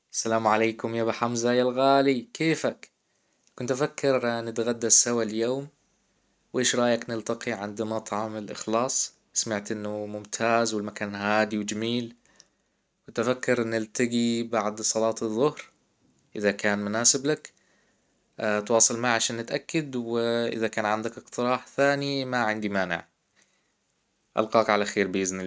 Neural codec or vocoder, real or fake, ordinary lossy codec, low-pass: none; real; none; none